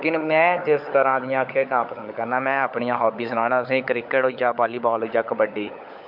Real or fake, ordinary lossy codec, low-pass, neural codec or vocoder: fake; MP3, 48 kbps; 5.4 kHz; codec, 16 kHz, 16 kbps, FunCodec, trained on LibriTTS, 50 frames a second